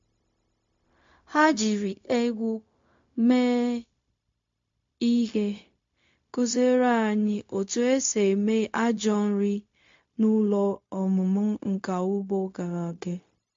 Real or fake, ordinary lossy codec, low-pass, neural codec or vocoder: fake; MP3, 48 kbps; 7.2 kHz; codec, 16 kHz, 0.4 kbps, LongCat-Audio-Codec